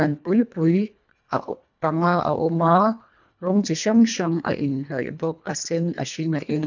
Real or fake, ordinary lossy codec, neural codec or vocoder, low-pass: fake; none; codec, 24 kHz, 1.5 kbps, HILCodec; 7.2 kHz